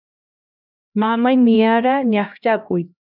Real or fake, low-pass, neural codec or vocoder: fake; 5.4 kHz; codec, 16 kHz, 1 kbps, X-Codec, HuBERT features, trained on LibriSpeech